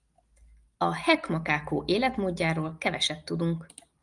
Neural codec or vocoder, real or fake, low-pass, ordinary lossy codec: none; real; 10.8 kHz; Opus, 32 kbps